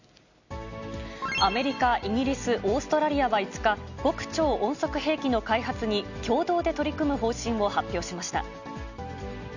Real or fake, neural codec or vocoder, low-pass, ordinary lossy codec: real; none; 7.2 kHz; none